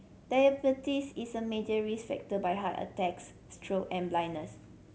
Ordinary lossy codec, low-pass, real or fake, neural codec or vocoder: none; none; real; none